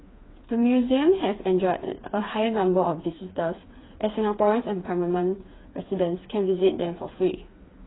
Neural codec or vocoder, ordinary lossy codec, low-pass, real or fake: codec, 16 kHz, 4 kbps, FreqCodec, smaller model; AAC, 16 kbps; 7.2 kHz; fake